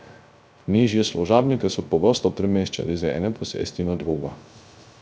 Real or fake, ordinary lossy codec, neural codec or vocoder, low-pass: fake; none; codec, 16 kHz, 0.3 kbps, FocalCodec; none